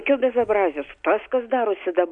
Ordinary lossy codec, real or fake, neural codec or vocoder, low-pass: MP3, 64 kbps; real; none; 9.9 kHz